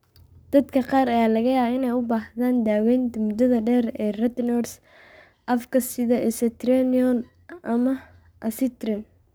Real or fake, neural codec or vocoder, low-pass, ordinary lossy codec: fake; codec, 44.1 kHz, 7.8 kbps, Pupu-Codec; none; none